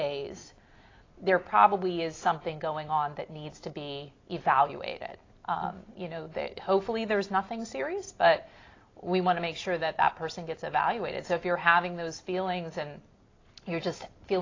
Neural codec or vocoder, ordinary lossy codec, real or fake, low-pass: none; AAC, 32 kbps; real; 7.2 kHz